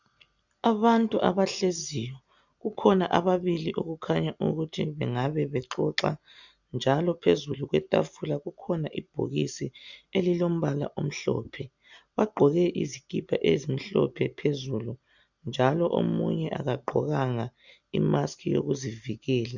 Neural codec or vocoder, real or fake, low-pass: none; real; 7.2 kHz